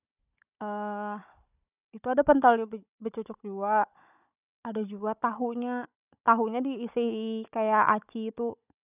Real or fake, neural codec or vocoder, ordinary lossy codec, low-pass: fake; codec, 16 kHz, 16 kbps, FunCodec, trained on Chinese and English, 50 frames a second; none; 3.6 kHz